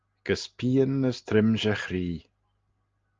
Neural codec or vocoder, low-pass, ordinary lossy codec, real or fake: none; 7.2 kHz; Opus, 24 kbps; real